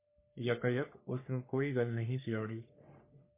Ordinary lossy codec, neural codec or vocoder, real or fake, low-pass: MP3, 24 kbps; codec, 44.1 kHz, 3.4 kbps, Pupu-Codec; fake; 3.6 kHz